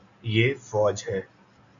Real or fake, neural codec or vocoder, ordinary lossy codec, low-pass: real; none; AAC, 64 kbps; 7.2 kHz